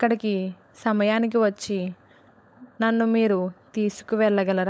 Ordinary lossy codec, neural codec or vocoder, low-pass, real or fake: none; codec, 16 kHz, 16 kbps, FunCodec, trained on LibriTTS, 50 frames a second; none; fake